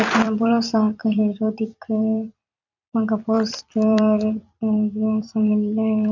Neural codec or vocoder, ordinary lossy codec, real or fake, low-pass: vocoder, 44.1 kHz, 128 mel bands every 256 samples, BigVGAN v2; none; fake; 7.2 kHz